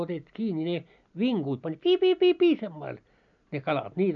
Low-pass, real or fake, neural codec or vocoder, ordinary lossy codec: 7.2 kHz; real; none; none